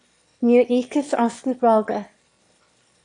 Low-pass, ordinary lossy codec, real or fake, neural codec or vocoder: 9.9 kHz; AAC, 48 kbps; fake; autoencoder, 22.05 kHz, a latent of 192 numbers a frame, VITS, trained on one speaker